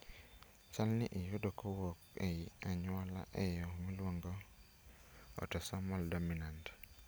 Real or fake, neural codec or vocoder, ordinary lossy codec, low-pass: real; none; none; none